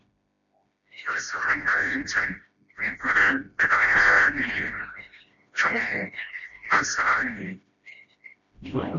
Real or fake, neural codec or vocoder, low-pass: fake; codec, 16 kHz, 1 kbps, FreqCodec, smaller model; 7.2 kHz